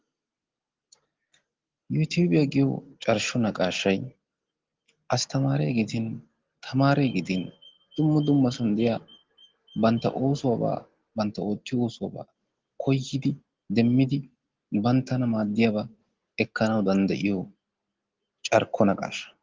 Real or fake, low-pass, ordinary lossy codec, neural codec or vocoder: real; 7.2 kHz; Opus, 16 kbps; none